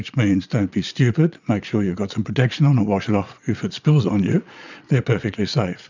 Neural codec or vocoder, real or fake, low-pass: vocoder, 22.05 kHz, 80 mel bands, Vocos; fake; 7.2 kHz